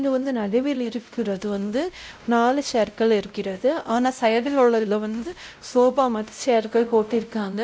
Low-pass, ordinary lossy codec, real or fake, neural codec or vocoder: none; none; fake; codec, 16 kHz, 0.5 kbps, X-Codec, WavLM features, trained on Multilingual LibriSpeech